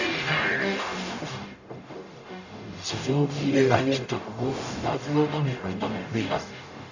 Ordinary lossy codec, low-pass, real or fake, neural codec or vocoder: none; 7.2 kHz; fake; codec, 44.1 kHz, 0.9 kbps, DAC